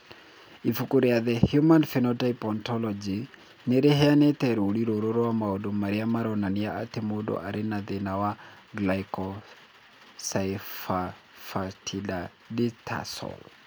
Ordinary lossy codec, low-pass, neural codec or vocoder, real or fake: none; none; none; real